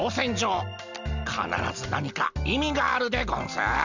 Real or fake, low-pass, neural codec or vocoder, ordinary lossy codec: real; 7.2 kHz; none; none